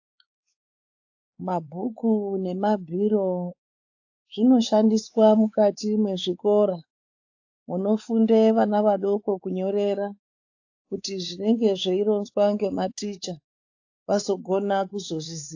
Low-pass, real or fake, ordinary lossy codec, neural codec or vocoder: 7.2 kHz; fake; AAC, 48 kbps; codec, 16 kHz, 4 kbps, X-Codec, WavLM features, trained on Multilingual LibriSpeech